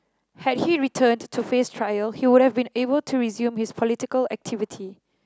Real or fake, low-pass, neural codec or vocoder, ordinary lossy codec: real; none; none; none